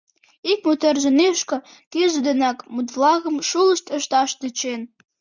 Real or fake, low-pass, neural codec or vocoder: real; 7.2 kHz; none